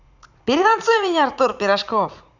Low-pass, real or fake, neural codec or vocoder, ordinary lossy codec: 7.2 kHz; fake; vocoder, 44.1 kHz, 80 mel bands, Vocos; none